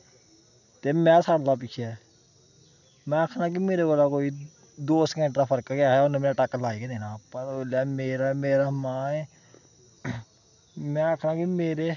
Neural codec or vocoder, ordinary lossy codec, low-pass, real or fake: none; none; 7.2 kHz; real